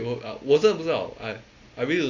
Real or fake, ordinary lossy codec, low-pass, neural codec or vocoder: real; AAC, 48 kbps; 7.2 kHz; none